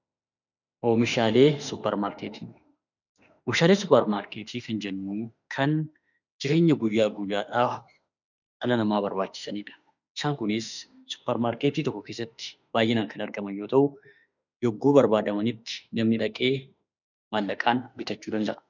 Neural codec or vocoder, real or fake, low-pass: autoencoder, 48 kHz, 32 numbers a frame, DAC-VAE, trained on Japanese speech; fake; 7.2 kHz